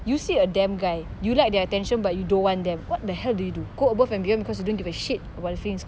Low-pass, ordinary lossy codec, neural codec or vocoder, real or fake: none; none; none; real